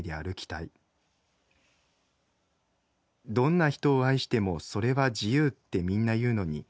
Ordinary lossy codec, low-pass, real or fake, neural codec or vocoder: none; none; real; none